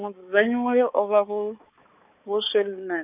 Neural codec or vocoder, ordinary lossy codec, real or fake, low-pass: codec, 16 kHz, 4 kbps, X-Codec, HuBERT features, trained on balanced general audio; none; fake; 3.6 kHz